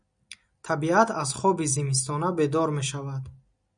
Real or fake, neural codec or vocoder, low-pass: real; none; 9.9 kHz